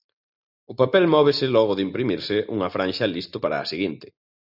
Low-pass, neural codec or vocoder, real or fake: 5.4 kHz; none; real